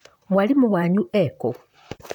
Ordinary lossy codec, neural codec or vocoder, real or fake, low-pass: none; vocoder, 44.1 kHz, 128 mel bands, Pupu-Vocoder; fake; 19.8 kHz